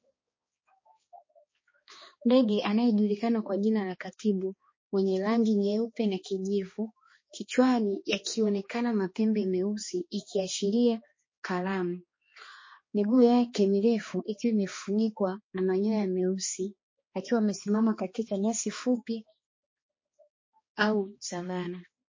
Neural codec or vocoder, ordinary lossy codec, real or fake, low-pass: codec, 16 kHz, 2 kbps, X-Codec, HuBERT features, trained on balanced general audio; MP3, 32 kbps; fake; 7.2 kHz